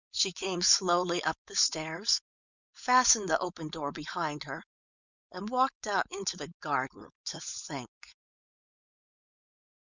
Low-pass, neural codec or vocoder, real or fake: 7.2 kHz; codec, 16 kHz, 4.8 kbps, FACodec; fake